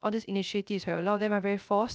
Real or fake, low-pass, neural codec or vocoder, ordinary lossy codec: fake; none; codec, 16 kHz, 0.3 kbps, FocalCodec; none